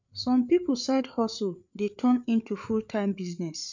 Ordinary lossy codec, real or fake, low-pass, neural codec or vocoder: none; fake; 7.2 kHz; codec, 16 kHz, 8 kbps, FreqCodec, larger model